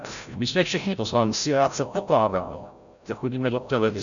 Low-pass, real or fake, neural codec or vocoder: 7.2 kHz; fake; codec, 16 kHz, 0.5 kbps, FreqCodec, larger model